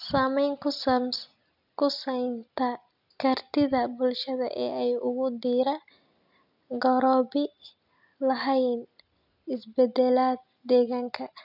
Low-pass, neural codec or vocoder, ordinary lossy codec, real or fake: 5.4 kHz; none; none; real